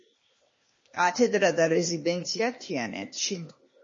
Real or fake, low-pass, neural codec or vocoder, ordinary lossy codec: fake; 7.2 kHz; codec, 16 kHz, 0.8 kbps, ZipCodec; MP3, 32 kbps